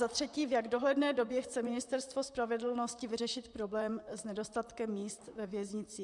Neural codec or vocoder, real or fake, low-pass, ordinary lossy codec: vocoder, 44.1 kHz, 128 mel bands, Pupu-Vocoder; fake; 10.8 kHz; MP3, 96 kbps